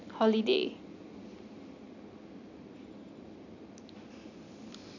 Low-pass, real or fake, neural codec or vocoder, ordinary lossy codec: 7.2 kHz; real; none; none